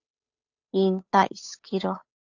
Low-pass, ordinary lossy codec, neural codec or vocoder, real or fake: 7.2 kHz; AAC, 48 kbps; codec, 16 kHz, 8 kbps, FunCodec, trained on Chinese and English, 25 frames a second; fake